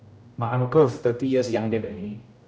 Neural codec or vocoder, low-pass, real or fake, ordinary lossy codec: codec, 16 kHz, 0.5 kbps, X-Codec, HuBERT features, trained on general audio; none; fake; none